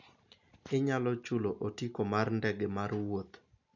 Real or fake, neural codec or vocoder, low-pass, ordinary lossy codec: real; none; 7.2 kHz; none